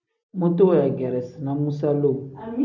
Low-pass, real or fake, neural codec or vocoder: 7.2 kHz; real; none